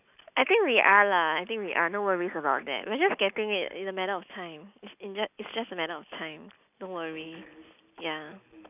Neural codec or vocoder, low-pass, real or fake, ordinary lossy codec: none; 3.6 kHz; real; none